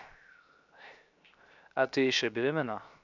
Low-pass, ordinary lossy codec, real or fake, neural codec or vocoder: 7.2 kHz; none; fake; codec, 16 kHz, 0.7 kbps, FocalCodec